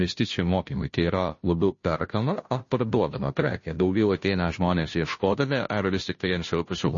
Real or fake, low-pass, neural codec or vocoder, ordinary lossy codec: fake; 7.2 kHz; codec, 16 kHz, 0.5 kbps, FunCodec, trained on Chinese and English, 25 frames a second; MP3, 32 kbps